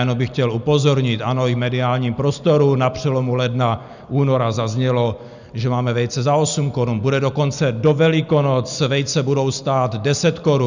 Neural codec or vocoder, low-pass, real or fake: none; 7.2 kHz; real